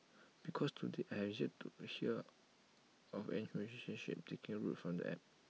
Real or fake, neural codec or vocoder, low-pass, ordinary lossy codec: real; none; none; none